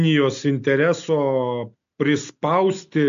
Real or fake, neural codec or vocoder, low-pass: real; none; 7.2 kHz